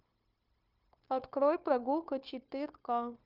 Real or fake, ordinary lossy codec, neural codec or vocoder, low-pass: fake; Opus, 24 kbps; codec, 16 kHz, 0.9 kbps, LongCat-Audio-Codec; 5.4 kHz